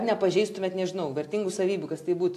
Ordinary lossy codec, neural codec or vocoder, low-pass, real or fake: AAC, 64 kbps; none; 14.4 kHz; real